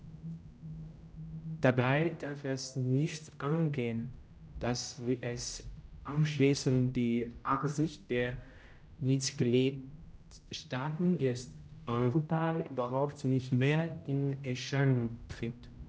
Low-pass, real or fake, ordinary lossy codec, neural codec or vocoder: none; fake; none; codec, 16 kHz, 0.5 kbps, X-Codec, HuBERT features, trained on general audio